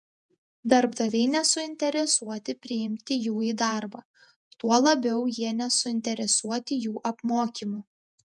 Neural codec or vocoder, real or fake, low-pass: vocoder, 48 kHz, 128 mel bands, Vocos; fake; 10.8 kHz